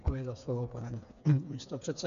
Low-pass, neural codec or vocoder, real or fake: 7.2 kHz; codec, 16 kHz, 4 kbps, FreqCodec, smaller model; fake